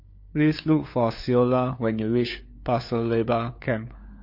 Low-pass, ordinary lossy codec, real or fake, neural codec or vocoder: 5.4 kHz; MP3, 32 kbps; fake; codec, 16 kHz, 4 kbps, FunCodec, trained on LibriTTS, 50 frames a second